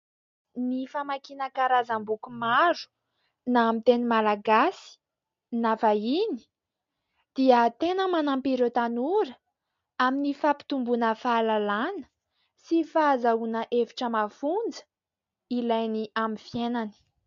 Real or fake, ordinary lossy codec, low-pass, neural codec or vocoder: real; MP3, 48 kbps; 7.2 kHz; none